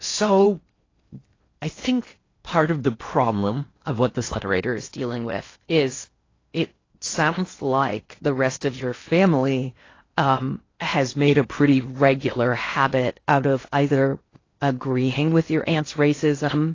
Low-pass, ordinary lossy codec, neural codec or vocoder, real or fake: 7.2 kHz; AAC, 32 kbps; codec, 16 kHz in and 24 kHz out, 0.6 kbps, FocalCodec, streaming, 4096 codes; fake